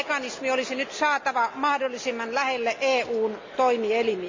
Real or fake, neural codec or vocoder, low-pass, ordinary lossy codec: real; none; 7.2 kHz; MP3, 64 kbps